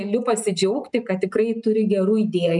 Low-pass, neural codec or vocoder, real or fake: 10.8 kHz; none; real